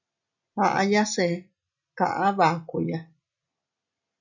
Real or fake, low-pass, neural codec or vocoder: real; 7.2 kHz; none